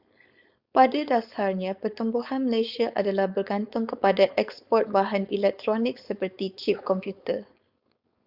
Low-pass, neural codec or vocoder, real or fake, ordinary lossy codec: 5.4 kHz; codec, 16 kHz, 4.8 kbps, FACodec; fake; Opus, 64 kbps